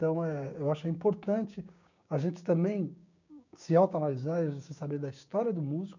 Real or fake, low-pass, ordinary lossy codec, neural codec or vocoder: fake; 7.2 kHz; none; codec, 16 kHz, 6 kbps, DAC